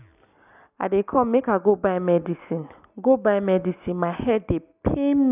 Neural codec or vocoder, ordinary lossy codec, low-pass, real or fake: none; none; 3.6 kHz; real